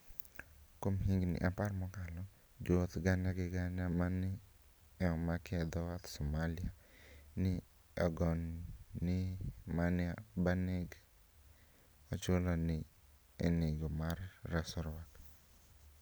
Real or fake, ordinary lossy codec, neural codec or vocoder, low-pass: real; none; none; none